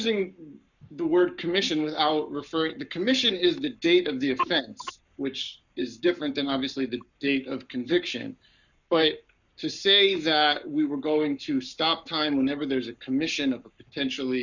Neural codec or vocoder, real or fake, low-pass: codec, 44.1 kHz, 7.8 kbps, Pupu-Codec; fake; 7.2 kHz